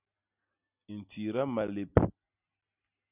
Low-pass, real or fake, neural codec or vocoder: 3.6 kHz; real; none